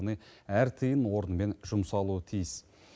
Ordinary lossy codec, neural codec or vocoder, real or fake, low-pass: none; none; real; none